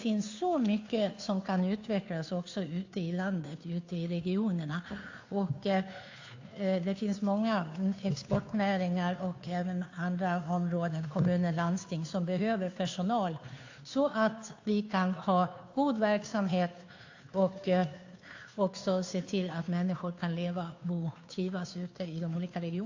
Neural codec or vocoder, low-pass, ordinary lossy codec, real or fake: codec, 16 kHz, 2 kbps, FunCodec, trained on Chinese and English, 25 frames a second; 7.2 kHz; AAC, 48 kbps; fake